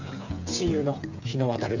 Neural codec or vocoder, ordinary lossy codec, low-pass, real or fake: codec, 16 kHz, 8 kbps, FreqCodec, smaller model; none; 7.2 kHz; fake